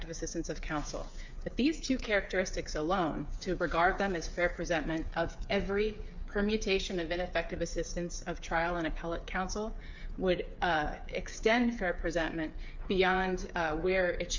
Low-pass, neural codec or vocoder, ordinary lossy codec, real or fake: 7.2 kHz; codec, 16 kHz, 8 kbps, FreqCodec, smaller model; MP3, 64 kbps; fake